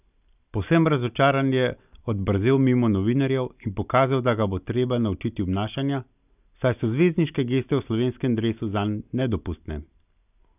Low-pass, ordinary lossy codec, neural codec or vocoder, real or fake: 3.6 kHz; none; none; real